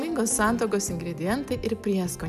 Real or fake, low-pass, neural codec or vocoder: real; 14.4 kHz; none